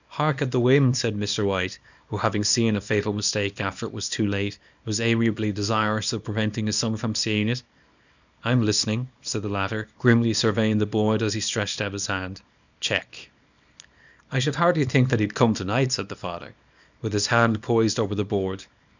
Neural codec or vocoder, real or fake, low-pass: codec, 24 kHz, 0.9 kbps, WavTokenizer, small release; fake; 7.2 kHz